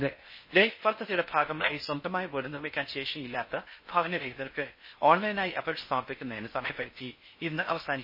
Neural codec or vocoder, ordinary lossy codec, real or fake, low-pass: codec, 16 kHz in and 24 kHz out, 0.6 kbps, FocalCodec, streaming, 4096 codes; MP3, 24 kbps; fake; 5.4 kHz